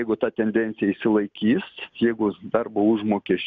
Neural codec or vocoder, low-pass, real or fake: none; 7.2 kHz; real